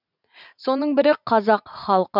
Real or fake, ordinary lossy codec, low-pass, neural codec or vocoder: fake; none; 5.4 kHz; vocoder, 44.1 kHz, 80 mel bands, Vocos